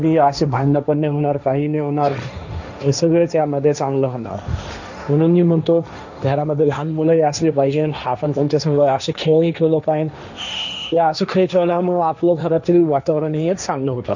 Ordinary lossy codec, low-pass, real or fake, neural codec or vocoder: none; 7.2 kHz; fake; codec, 16 kHz, 1.1 kbps, Voila-Tokenizer